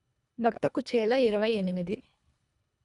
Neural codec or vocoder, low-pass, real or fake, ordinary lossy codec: codec, 24 kHz, 1.5 kbps, HILCodec; 10.8 kHz; fake; none